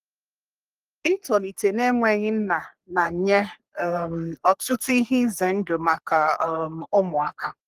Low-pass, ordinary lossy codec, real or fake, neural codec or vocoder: 14.4 kHz; Opus, 24 kbps; fake; codec, 44.1 kHz, 3.4 kbps, Pupu-Codec